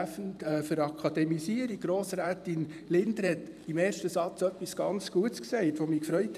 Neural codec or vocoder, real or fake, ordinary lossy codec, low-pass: vocoder, 44.1 kHz, 128 mel bands every 512 samples, BigVGAN v2; fake; none; 14.4 kHz